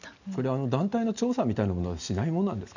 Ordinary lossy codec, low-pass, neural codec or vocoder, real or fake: none; 7.2 kHz; none; real